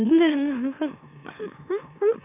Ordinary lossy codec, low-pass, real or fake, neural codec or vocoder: none; 3.6 kHz; fake; autoencoder, 44.1 kHz, a latent of 192 numbers a frame, MeloTTS